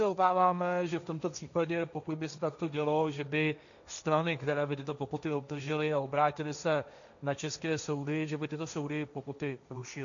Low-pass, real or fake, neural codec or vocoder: 7.2 kHz; fake; codec, 16 kHz, 1.1 kbps, Voila-Tokenizer